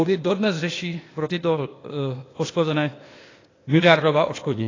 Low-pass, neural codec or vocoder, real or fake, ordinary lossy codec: 7.2 kHz; codec, 16 kHz, 0.8 kbps, ZipCodec; fake; AAC, 32 kbps